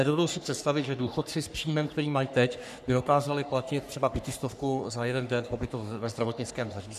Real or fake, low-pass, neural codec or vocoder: fake; 14.4 kHz; codec, 44.1 kHz, 3.4 kbps, Pupu-Codec